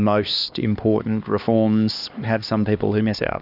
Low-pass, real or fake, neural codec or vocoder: 5.4 kHz; fake; codec, 16 kHz, 2 kbps, X-Codec, HuBERT features, trained on LibriSpeech